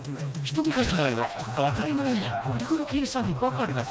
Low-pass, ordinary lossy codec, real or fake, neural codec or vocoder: none; none; fake; codec, 16 kHz, 1 kbps, FreqCodec, smaller model